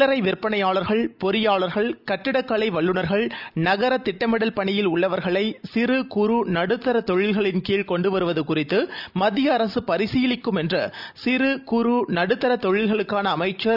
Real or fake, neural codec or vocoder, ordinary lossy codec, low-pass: real; none; none; 5.4 kHz